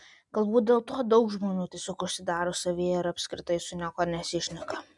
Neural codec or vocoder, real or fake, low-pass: none; real; 10.8 kHz